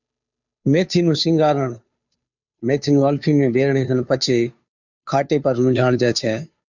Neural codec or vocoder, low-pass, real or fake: codec, 16 kHz, 2 kbps, FunCodec, trained on Chinese and English, 25 frames a second; 7.2 kHz; fake